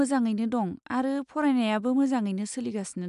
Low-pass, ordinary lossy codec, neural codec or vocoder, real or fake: 10.8 kHz; none; none; real